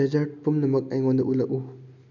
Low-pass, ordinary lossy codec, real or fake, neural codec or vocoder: 7.2 kHz; none; real; none